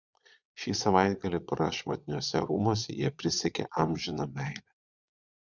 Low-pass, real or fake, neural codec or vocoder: 7.2 kHz; fake; vocoder, 22.05 kHz, 80 mel bands, Vocos